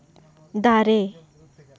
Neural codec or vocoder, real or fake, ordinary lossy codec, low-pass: none; real; none; none